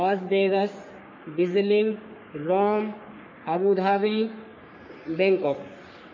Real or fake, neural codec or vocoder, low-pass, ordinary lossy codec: fake; codec, 44.1 kHz, 3.4 kbps, Pupu-Codec; 7.2 kHz; MP3, 32 kbps